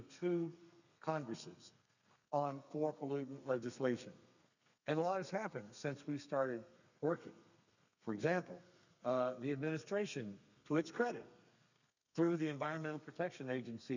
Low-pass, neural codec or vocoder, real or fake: 7.2 kHz; codec, 32 kHz, 1.9 kbps, SNAC; fake